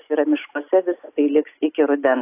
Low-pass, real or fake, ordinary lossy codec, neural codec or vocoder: 3.6 kHz; real; AAC, 24 kbps; none